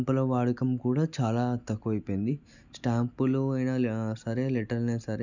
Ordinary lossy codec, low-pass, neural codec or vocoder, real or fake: none; 7.2 kHz; none; real